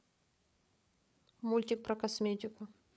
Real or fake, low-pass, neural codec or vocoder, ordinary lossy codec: fake; none; codec, 16 kHz, 8 kbps, FreqCodec, larger model; none